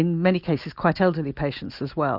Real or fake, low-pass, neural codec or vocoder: real; 5.4 kHz; none